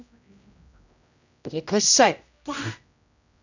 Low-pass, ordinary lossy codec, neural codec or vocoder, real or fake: 7.2 kHz; none; codec, 16 kHz, 0.5 kbps, X-Codec, HuBERT features, trained on general audio; fake